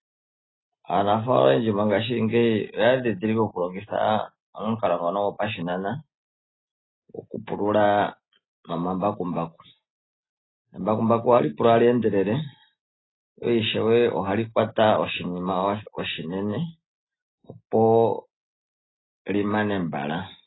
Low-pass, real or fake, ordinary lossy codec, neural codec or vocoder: 7.2 kHz; real; AAC, 16 kbps; none